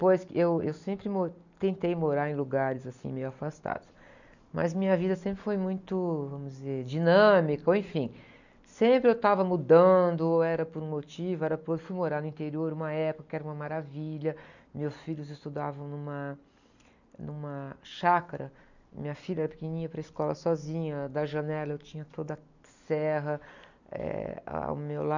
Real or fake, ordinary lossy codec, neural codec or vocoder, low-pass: real; none; none; 7.2 kHz